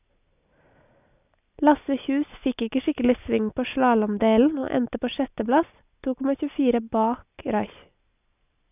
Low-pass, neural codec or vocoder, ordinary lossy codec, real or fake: 3.6 kHz; none; none; real